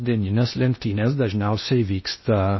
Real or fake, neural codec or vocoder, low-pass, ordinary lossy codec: fake; codec, 16 kHz in and 24 kHz out, 0.6 kbps, FocalCodec, streaming, 2048 codes; 7.2 kHz; MP3, 24 kbps